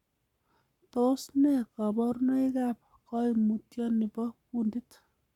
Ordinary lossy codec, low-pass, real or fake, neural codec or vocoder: none; 19.8 kHz; fake; codec, 44.1 kHz, 7.8 kbps, Pupu-Codec